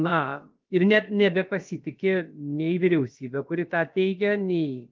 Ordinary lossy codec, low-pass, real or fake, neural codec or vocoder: Opus, 32 kbps; 7.2 kHz; fake; codec, 16 kHz, about 1 kbps, DyCAST, with the encoder's durations